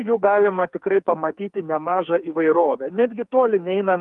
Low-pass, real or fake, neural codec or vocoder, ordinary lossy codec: 10.8 kHz; fake; codec, 44.1 kHz, 2.6 kbps, SNAC; Opus, 32 kbps